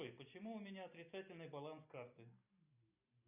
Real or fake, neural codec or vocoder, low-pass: real; none; 3.6 kHz